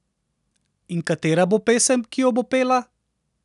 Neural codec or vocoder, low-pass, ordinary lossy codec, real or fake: none; 10.8 kHz; none; real